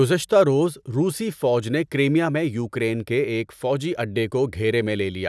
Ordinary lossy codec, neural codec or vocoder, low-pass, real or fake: none; none; none; real